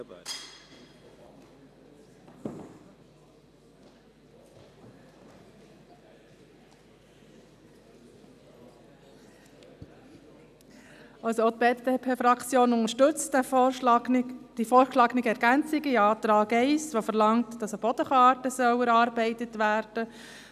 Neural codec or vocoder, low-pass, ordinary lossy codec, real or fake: none; 14.4 kHz; none; real